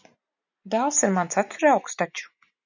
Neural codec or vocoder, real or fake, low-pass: none; real; 7.2 kHz